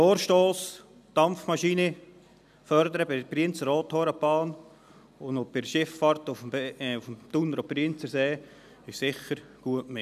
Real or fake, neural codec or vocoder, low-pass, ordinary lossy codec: real; none; 14.4 kHz; none